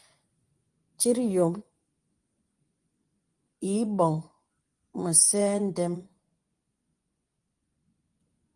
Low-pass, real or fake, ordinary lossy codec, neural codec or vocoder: 10.8 kHz; fake; Opus, 24 kbps; vocoder, 24 kHz, 100 mel bands, Vocos